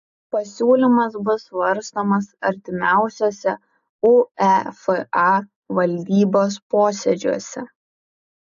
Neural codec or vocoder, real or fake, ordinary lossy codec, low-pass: none; real; AAC, 96 kbps; 7.2 kHz